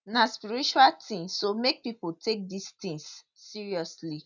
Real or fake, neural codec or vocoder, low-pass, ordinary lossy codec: real; none; 7.2 kHz; none